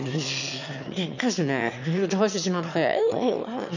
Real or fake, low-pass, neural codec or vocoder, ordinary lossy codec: fake; 7.2 kHz; autoencoder, 22.05 kHz, a latent of 192 numbers a frame, VITS, trained on one speaker; none